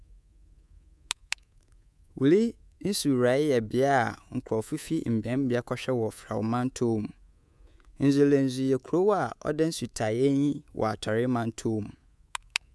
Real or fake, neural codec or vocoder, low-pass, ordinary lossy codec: fake; codec, 24 kHz, 3.1 kbps, DualCodec; none; none